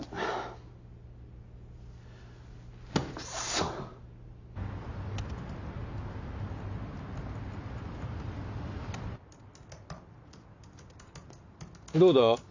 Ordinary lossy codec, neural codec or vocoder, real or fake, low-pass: AAC, 48 kbps; none; real; 7.2 kHz